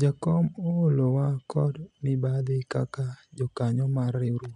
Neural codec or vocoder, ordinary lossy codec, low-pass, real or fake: none; none; 10.8 kHz; real